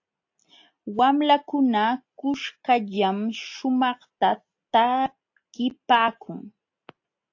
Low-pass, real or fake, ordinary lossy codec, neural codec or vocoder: 7.2 kHz; real; AAC, 48 kbps; none